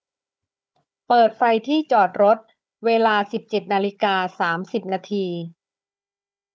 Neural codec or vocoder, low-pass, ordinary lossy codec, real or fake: codec, 16 kHz, 4 kbps, FunCodec, trained on Chinese and English, 50 frames a second; none; none; fake